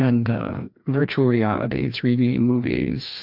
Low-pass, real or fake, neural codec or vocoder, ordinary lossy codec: 5.4 kHz; fake; codec, 16 kHz, 1 kbps, FreqCodec, larger model; MP3, 48 kbps